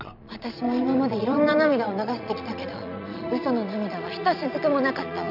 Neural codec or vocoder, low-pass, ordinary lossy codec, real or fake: vocoder, 22.05 kHz, 80 mel bands, Vocos; 5.4 kHz; none; fake